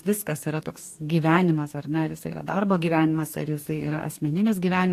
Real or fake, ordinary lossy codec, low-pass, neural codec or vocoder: fake; AAC, 64 kbps; 14.4 kHz; codec, 44.1 kHz, 2.6 kbps, DAC